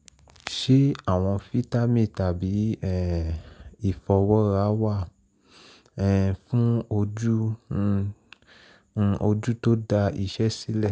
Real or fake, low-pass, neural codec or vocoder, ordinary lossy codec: real; none; none; none